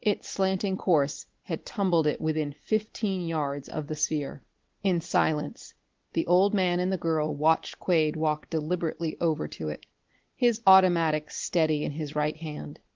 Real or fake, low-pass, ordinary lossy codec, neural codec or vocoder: real; 7.2 kHz; Opus, 16 kbps; none